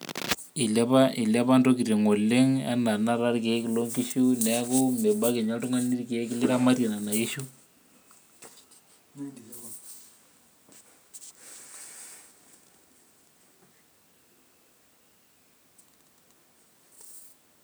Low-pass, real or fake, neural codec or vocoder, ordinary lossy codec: none; real; none; none